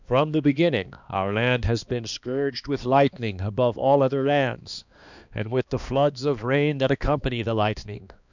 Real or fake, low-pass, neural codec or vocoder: fake; 7.2 kHz; codec, 16 kHz, 2 kbps, X-Codec, HuBERT features, trained on balanced general audio